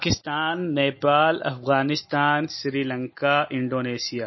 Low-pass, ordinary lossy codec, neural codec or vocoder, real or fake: 7.2 kHz; MP3, 24 kbps; none; real